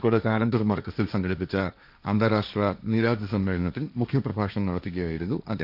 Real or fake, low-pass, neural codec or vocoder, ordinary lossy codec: fake; 5.4 kHz; codec, 16 kHz, 1.1 kbps, Voila-Tokenizer; none